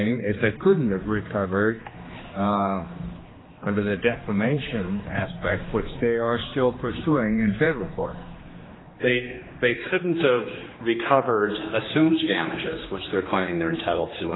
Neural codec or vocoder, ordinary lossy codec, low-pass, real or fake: codec, 16 kHz, 2 kbps, X-Codec, HuBERT features, trained on general audio; AAC, 16 kbps; 7.2 kHz; fake